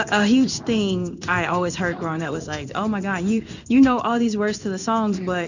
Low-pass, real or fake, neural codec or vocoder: 7.2 kHz; fake; codec, 16 kHz in and 24 kHz out, 1 kbps, XY-Tokenizer